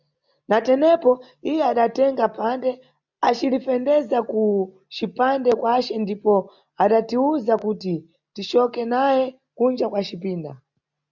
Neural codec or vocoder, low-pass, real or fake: none; 7.2 kHz; real